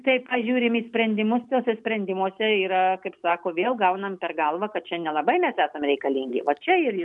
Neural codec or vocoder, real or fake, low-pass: none; real; 10.8 kHz